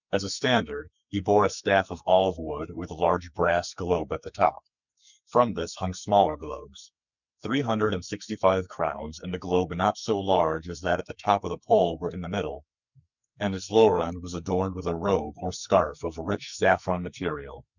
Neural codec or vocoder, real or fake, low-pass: codec, 44.1 kHz, 2.6 kbps, SNAC; fake; 7.2 kHz